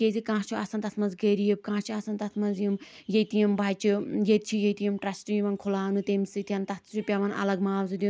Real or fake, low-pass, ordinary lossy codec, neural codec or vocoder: real; none; none; none